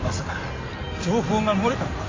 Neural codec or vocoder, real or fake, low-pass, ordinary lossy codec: codec, 16 kHz in and 24 kHz out, 2.2 kbps, FireRedTTS-2 codec; fake; 7.2 kHz; AAC, 32 kbps